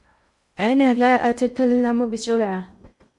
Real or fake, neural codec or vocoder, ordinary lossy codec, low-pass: fake; codec, 16 kHz in and 24 kHz out, 0.6 kbps, FocalCodec, streaming, 2048 codes; MP3, 64 kbps; 10.8 kHz